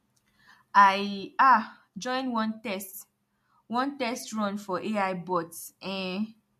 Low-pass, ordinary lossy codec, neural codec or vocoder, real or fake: 14.4 kHz; MP3, 64 kbps; none; real